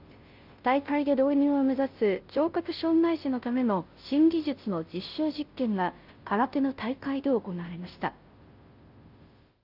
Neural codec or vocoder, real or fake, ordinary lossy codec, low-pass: codec, 16 kHz, 0.5 kbps, FunCodec, trained on Chinese and English, 25 frames a second; fake; Opus, 32 kbps; 5.4 kHz